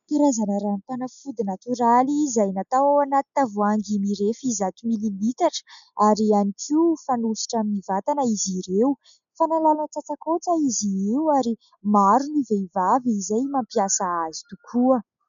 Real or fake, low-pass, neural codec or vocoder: real; 7.2 kHz; none